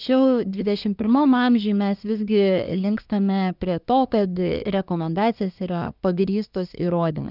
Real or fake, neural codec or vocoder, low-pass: fake; codec, 24 kHz, 1 kbps, SNAC; 5.4 kHz